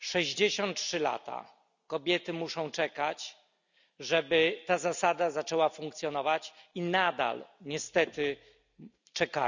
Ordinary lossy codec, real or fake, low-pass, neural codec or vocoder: none; real; 7.2 kHz; none